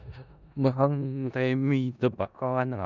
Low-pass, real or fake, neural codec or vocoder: 7.2 kHz; fake; codec, 16 kHz in and 24 kHz out, 0.4 kbps, LongCat-Audio-Codec, four codebook decoder